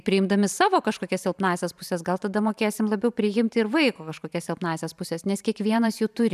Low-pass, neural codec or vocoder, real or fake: 14.4 kHz; vocoder, 44.1 kHz, 128 mel bands every 512 samples, BigVGAN v2; fake